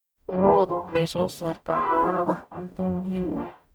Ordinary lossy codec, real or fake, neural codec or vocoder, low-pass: none; fake; codec, 44.1 kHz, 0.9 kbps, DAC; none